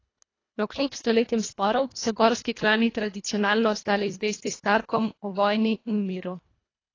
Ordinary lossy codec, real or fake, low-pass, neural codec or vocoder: AAC, 32 kbps; fake; 7.2 kHz; codec, 24 kHz, 1.5 kbps, HILCodec